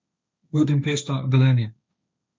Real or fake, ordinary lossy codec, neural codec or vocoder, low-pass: fake; none; codec, 16 kHz, 1.1 kbps, Voila-Tokenizer; none